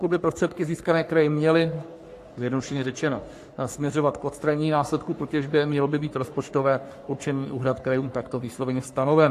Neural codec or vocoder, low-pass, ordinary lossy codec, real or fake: codec, 44.1 kHz, 3.4 kbps, Pupu-Codec; 14.4 kHz; AAC, 64 kbps; fake